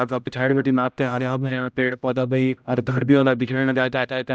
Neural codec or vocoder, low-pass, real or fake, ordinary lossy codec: codec, 16 kHz, 0.5 kbps, X-Codec, HuBERT features, trained on general audio; none; fake; none